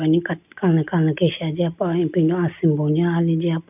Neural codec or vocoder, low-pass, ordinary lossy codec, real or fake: none; 3.6 kHz; none; real